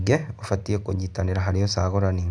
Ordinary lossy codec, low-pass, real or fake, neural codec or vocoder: none; 9.9 kHz; real; none